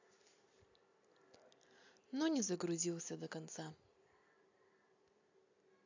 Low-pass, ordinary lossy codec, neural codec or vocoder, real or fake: 7.2 kHz; AAC, 48 kbps; none; real